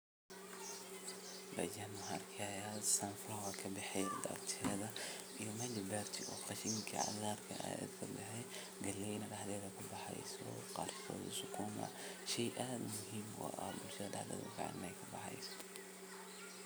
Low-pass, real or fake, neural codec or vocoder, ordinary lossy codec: none; real; none; none